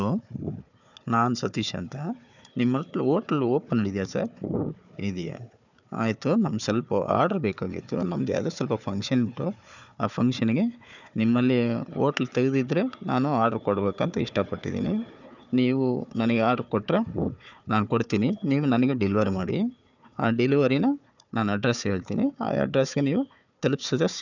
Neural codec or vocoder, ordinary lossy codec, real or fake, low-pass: codec, 16 kHz, 4 kbps, FunCodec, trained on Chinese and English, 50 frames a second; none; fake; 7.2 kHz